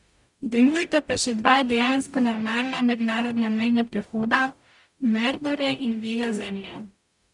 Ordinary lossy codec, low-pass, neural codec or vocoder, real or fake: none; 10.8 kHz; codec, 44.1 kHz, 0.9 kbps, DAC; fake